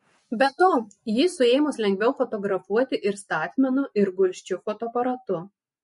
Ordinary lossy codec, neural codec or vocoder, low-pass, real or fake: MP3, 48 kbps; none; 10.8 kHz; real